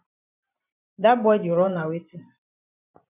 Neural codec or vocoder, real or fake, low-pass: vocoder, 44.1 kHz, 128 mel bands every 512 samples, BigVGAN v2; fake; 3.6 kHz